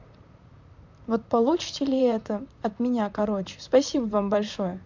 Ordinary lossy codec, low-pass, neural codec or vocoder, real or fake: MP3, 64 kbps; 7.2 kHz; vocoder, 44.1 kHz, 128 mel bands, Pupu-Vocoder; fake